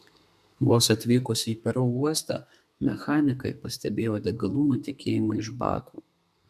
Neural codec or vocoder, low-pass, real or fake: codec, 32 kHz, 1.9 kbps, SNAC; 14.4 kHz; fake